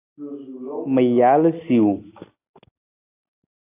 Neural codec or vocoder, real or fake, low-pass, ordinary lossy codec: none; real; 3.6 kHz; AAC, 24 kbps